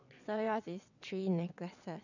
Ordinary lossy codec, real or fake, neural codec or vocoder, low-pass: none; fake; vocoder, 22.05 kHz, 80 mel bands, WaveNeXt; 7.2 kHz